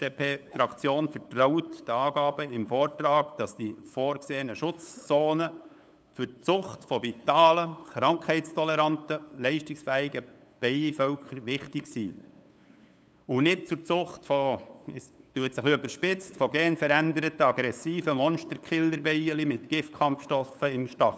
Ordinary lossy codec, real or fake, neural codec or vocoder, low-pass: none; fake; codec, 16 kHz, 8 kbps, FunCodec, trained on LibriTTS, 25 frames a second; none